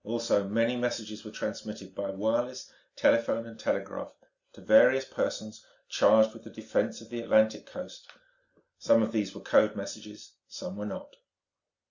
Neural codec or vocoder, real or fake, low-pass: none; real; 7.2 kHz